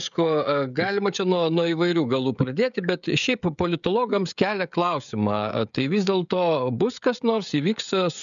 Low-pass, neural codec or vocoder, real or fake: 7.2 kHz; codec, 16 kHz, 16 kbps, FreqCodec, smaller model; fake